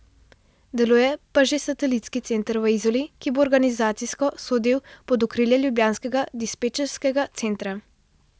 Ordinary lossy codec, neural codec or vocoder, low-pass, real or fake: none; none; none; real